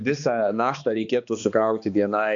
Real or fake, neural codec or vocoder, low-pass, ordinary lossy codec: fake; codec, 16 kHz, 2 kbps, X-Codec, HuBERT features, trained on balanced general audio; 7.2 kHz; AAC, 64 kbps